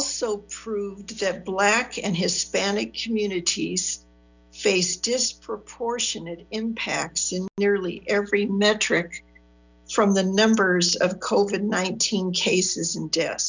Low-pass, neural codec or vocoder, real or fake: 7.2 kHz; none; real